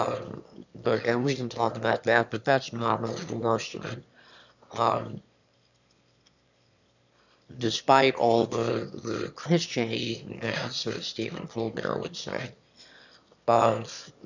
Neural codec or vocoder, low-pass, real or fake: autoencoder, 22.05 kHz, a latent of 192 numbers a frame, VITS, trained on one speaker; 7.2 kHz; fake